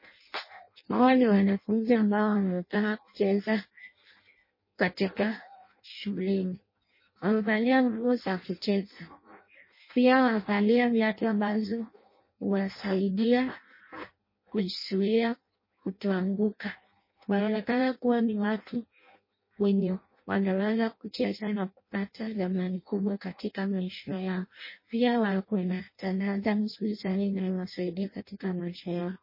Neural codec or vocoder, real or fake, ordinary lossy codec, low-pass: codec, 16 kHz in and 24 kHz out, 0.6 kbps, FireRedTTS-2 codec; fake; MP3, 24 kbps; 5.4 kHz